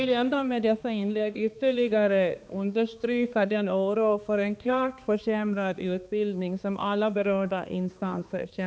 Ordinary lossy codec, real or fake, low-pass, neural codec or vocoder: none; fake; none; codec, 16 kHz, 2 kbps, X-Codec, HuBERT features, trained on balanced general audio